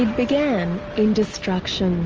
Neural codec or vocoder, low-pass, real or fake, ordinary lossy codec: none; 7.2 kHz; real; Opus, 24 kbps